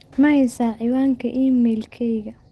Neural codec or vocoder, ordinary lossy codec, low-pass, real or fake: none; Opus, 16 kbps; 10.8 kHz; real